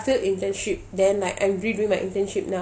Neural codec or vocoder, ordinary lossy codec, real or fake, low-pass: none; none; real; none